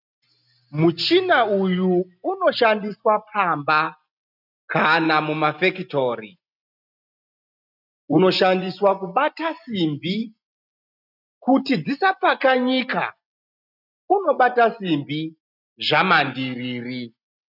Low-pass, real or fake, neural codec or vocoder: 5.4 kHz; real; none